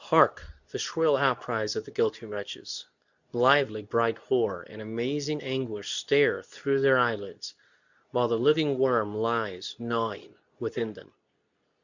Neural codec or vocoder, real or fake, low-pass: codec, 24 kHz, 0.9 kbps, WavTokenizer, medium speech release version 2; fake; 7.2 kHz